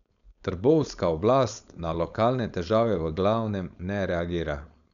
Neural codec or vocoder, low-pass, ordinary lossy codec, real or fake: codec, 16 kHz, 4.8 kbps, FACodec; 7.2 kHz; none; fake